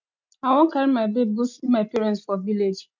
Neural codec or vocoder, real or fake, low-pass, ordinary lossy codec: none; real; 7.2 kHz; MP3, 64 kbps